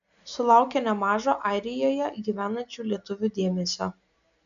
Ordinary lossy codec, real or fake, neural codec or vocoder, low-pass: MP3, 96 kbps; real; none; 7.2 kHz